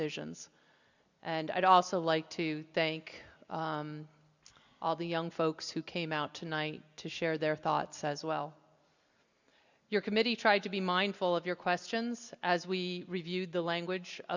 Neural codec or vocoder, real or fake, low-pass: none; real; 7.2 kHz